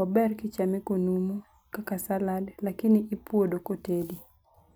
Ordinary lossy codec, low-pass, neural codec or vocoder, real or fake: none; none; none; real